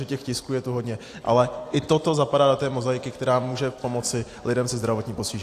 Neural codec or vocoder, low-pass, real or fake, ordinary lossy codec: none; 14.4 kHz; real; AAC, 64 kbps